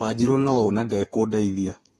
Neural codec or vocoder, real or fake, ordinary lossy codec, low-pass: codec, 32 kHz, 1.9 kbps, SNAC; fake; AAC, 32 kbps; 14.4 kHz